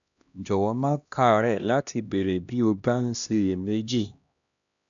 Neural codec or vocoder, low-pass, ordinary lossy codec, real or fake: codec, 16 kHz, 1 kbps, X-Codec, HuBERT features, trained on LibriSpeech; 7.2 kHz; none; fake